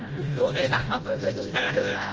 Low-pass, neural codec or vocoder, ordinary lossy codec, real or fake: 7.2 kHz; codec, 16 kHz, 0.5 kbps, FreqCodec, smaller model; Opus, 24 kbps; fake